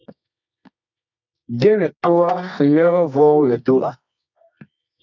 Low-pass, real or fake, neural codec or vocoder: 7.2 kHz; fake; codec, 24 kHz, 0.9 kbps, WavTokenizer, medium music audio release